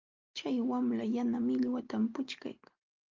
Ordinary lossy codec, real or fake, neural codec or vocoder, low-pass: Opus, 32 kbps; real; none; 7.2 kHz